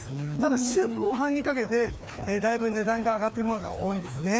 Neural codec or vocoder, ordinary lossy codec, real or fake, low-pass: codec, 16 kHz, 2 kbps, FreqCodec, larger model; none; fake; none